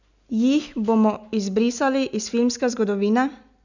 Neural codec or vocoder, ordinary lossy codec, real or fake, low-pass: none; none; real; 7.2 kHz